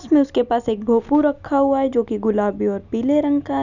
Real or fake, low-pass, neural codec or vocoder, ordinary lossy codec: real; 7.2 kHz; none; none